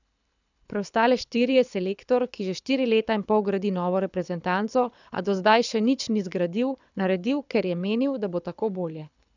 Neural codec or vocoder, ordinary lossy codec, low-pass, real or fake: codec, 24 kHz, 6 kbps, HILCodec; none; 7.2 kHz; fake